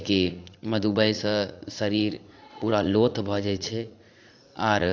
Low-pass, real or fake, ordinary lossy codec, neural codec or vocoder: 7.2 kHz; fake; AAC, 48 kbps; vocoder, 44.1 kHz, 80 mel bands, Vocos